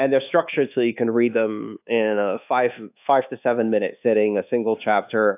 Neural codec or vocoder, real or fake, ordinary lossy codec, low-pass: codec, 24 kHz, 1.2 kbps, DualCodec; fake; AAC, 32 kbps; 3.6 kHz